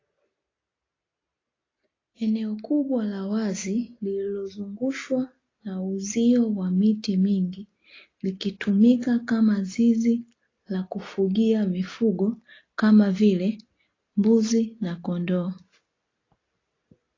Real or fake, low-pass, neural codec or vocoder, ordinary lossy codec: real; 7.2 kHz; none; AAC, 32 kbps